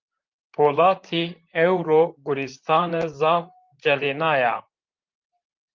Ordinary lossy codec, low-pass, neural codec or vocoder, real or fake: Opus, 32 kbps; 7.2 kHz; vocoder, 44.1 kHz, 128 mel bands, Pupu-Vocoder; fake